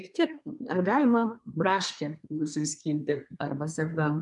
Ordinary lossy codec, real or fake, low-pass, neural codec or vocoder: MP3, 96 kbps; fake; 10.8 kHz; codec, 24 kHz, 1 kbps, SNAC